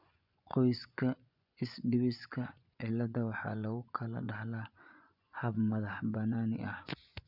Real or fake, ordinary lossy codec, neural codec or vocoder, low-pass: fake; none; vocoder, 24 kHz, 100 mel bands, Vocos; 5.4 kHz